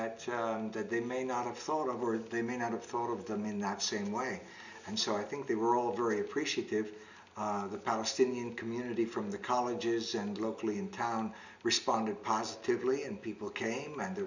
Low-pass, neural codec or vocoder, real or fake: 7.2 kHz; none; real